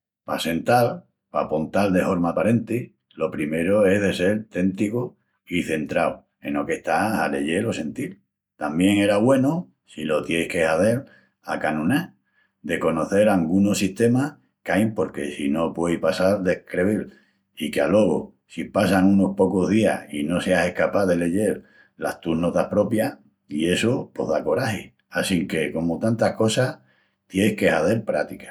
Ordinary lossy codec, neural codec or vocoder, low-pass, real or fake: none; none; 19.8 kHz; real